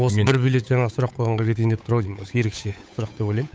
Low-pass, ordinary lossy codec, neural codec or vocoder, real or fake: none; none; codec, 16 kHz, 8 kbps, FunCodec, trained on Chinese and English, 25 frames a second; fake